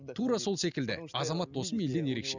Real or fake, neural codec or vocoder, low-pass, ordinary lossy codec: real; none; 7.2 kHz; none